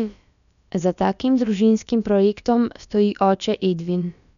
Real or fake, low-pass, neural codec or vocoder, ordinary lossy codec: fake; 7.2 kHz; codec, 16 kHz, about 1 kbps, DyCAST, with the encoder's durations; none